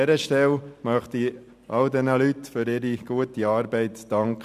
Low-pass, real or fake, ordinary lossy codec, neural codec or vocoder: 14.4 kHz; real; none; none